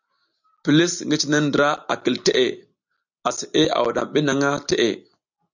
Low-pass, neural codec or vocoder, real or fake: 7.2 kHz; none; real